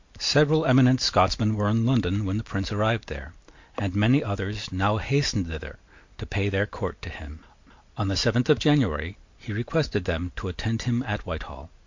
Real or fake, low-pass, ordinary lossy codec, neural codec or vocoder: real; 7.2 kHz; MP3, 48 kbps; none